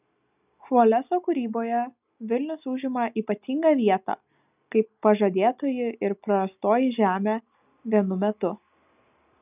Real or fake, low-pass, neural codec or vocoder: real; 3.6 kHz; none